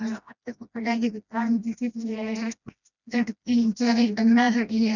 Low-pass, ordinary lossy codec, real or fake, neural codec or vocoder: 7.2 kHz; none; fake; codec, 16 kHz, 1 kbps, FreqCodec, smaller model